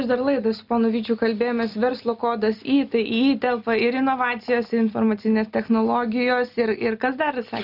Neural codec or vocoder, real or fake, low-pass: none; real; 5.4 kHz